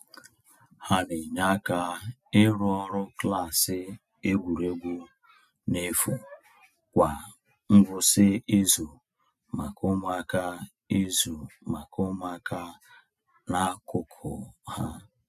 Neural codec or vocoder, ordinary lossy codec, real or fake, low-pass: none; none; real; 14.4 kHz